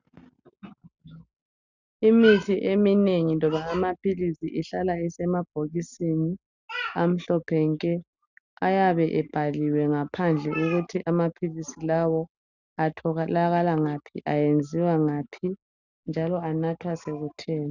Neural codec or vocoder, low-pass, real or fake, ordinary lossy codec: none; 7.2 kHz; real; Opus, 64 kbps